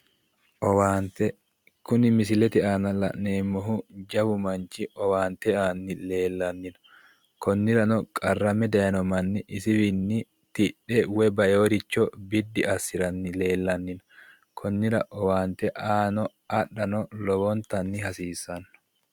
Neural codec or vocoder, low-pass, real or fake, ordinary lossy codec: none; 19.8 kHz; real; Opus, 64 kbps